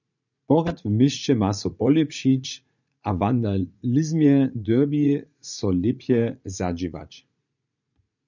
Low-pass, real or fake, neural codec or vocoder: 7.2 kHz; fake; vocoder, 44.1 kHz, 80 mel bands, Vocos